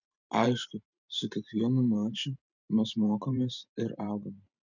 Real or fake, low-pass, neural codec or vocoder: fake; 7.2 kHz; vocoder, 44.1 kHz, 128 mel bands every 512 samples, BigVGAN v2